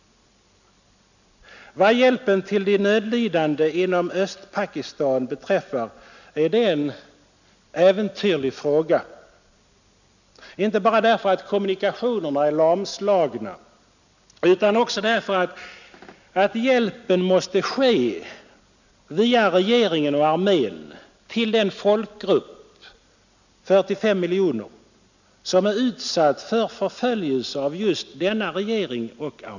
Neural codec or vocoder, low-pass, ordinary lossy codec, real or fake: none; 7.2 kHz; none; real